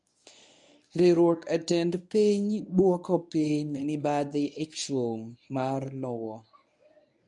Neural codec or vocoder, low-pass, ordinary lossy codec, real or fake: codec, 24 kHz, 0.9 kbps, WavTokenizer, medium speech release version 1; 10.8 kHz; none; fake